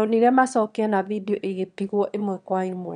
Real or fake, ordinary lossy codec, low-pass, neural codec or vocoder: fake; none; 9.9 kHz; autoencoder, 22.05 kHz, a latent of 192 numbers a frame, VITS, trained on one speaker